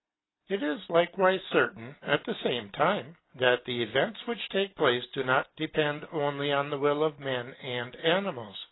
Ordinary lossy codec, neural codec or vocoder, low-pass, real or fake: AAC, 16 kbps; none; 7.2 kHz; real